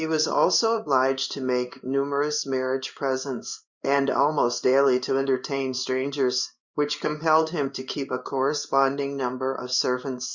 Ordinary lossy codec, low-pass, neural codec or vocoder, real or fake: Opus, 64 kbps; 7.2 kHz; codec, 16 kHz in and 24 kHz out, 1 kbps, XY-Tokenizer; fake